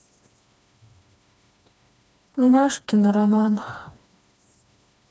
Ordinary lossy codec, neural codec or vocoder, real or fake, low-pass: none; codec, 16 kHz, 2 kbps, FreqCodec, smaller model; fake; none